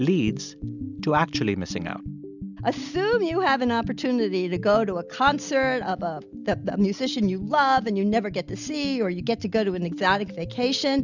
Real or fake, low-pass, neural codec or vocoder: real; 7.2 kHz; none